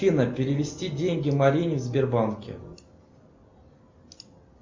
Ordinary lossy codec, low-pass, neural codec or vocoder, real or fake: MP3, 64 kbps; 7.2 kHz; none; real